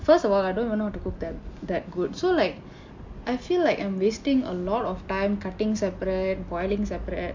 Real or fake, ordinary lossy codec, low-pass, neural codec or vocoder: real; MP3, 48 kbps; 7.2 kHz; none